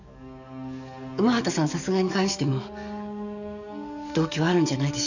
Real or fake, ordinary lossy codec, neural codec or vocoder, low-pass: fake; none; codec, 44.1 kHz, 7.8 kbps, DAC; 7.2 kHz